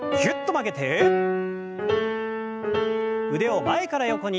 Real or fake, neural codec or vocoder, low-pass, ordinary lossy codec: real; none; none; none